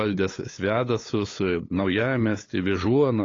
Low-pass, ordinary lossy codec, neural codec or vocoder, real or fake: 7.2 kHz; AAC, 32 kbps; codec, 16 kHz, 8 kbps, FunCodec, trained on LibriTTS, 25 frames a second; fake